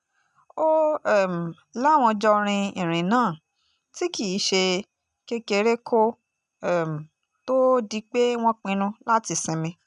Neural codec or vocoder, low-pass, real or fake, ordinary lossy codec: none; 10.8 kHz; real; none